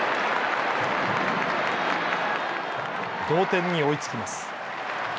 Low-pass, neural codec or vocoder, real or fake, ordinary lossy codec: none; none; real; none